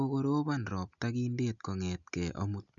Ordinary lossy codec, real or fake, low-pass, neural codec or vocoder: none; real; 7.2 kHz; none